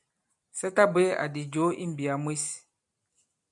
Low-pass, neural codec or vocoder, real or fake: 10.8 kHz; none; real